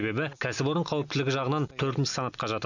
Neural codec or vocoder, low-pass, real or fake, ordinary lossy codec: none; 7.2 kHz; real; none